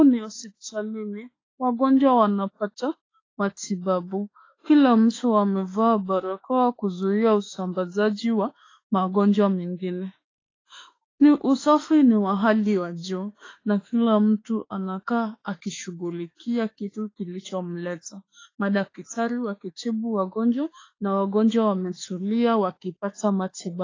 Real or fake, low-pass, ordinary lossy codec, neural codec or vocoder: fake; 7.2 kHz; AAC, 32 kbps; autoencoder, 48 kHz, 32 numbers a frame, DAC-VAE, trained on Japanese speech